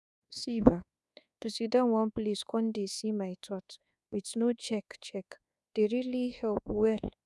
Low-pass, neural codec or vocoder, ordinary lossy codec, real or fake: none; codec, 24 kHz, 1.2 kbps, DualCodec; none; fake